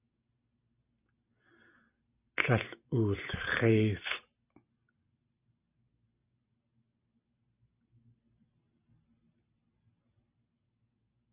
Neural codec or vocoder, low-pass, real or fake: none; 3.6 kHz; real